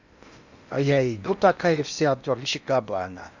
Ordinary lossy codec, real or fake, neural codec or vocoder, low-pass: none; fake; codec, 16 kHz in and 24 kHz out, 0.8 kbps, FocalCodec, streaming, 65536 codes; 7.2 kHz